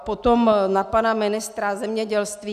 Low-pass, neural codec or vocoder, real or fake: 14.4 kHz; none; real